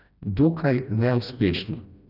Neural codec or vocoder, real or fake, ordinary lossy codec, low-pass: codec, 16 kHz, 1 kbps, FreqCodec, smaller model; fake; none; 5.4 kHz